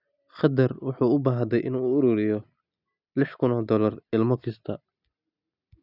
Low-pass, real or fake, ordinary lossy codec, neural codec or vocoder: 5.4 kHz; real; none; none